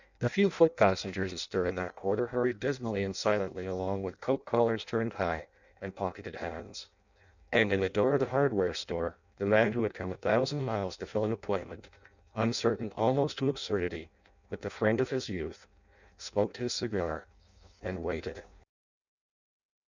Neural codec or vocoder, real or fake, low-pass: codec, 16 kHz in and 24 kHz out, 0.6 kbps, FireRedTTS-2 codec; fake; 7.2 kHz